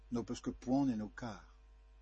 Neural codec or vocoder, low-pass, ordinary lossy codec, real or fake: none; 9.9 kHz; MP3, 32 kbps; real